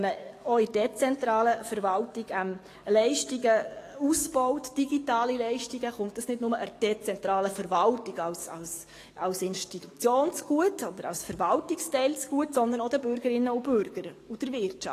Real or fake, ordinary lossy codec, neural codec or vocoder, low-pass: fake; AAC, 48 kbps; codec, 44.1 kHz, 7.8 kbps, DAC; 14.4 kHz